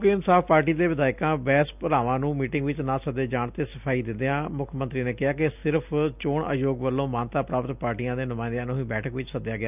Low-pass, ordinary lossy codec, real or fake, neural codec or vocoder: 3.6 kHz; none; real; none